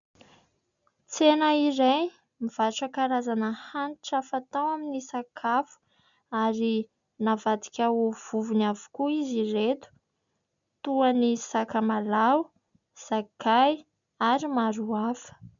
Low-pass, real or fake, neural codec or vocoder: 7.2 kHz; real; none